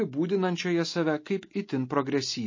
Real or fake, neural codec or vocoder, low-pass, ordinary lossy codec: real; none; 7.2 kHz; MP3, 32 kbps